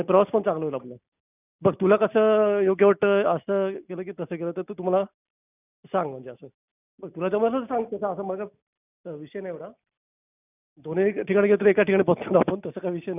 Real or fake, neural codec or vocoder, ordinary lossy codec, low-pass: real; none; none; 3.6 kHz